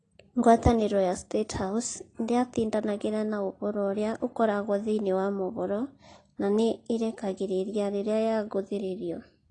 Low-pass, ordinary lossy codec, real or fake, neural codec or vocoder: 9.9 kHz; AAC, 32 kbps; real; none